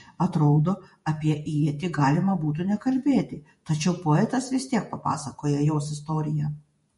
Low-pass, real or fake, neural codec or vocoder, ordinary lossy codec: 19.8 kHz; fake; vocoder, 48 kHz, 128 mel bands, Vocos; MP3, 48 kbps